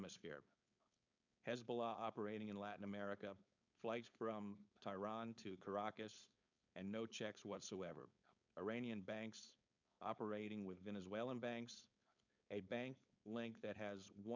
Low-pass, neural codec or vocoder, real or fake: 7.2 kHz; codec, 16 kHz, 4.8 kbps, FACodec; fake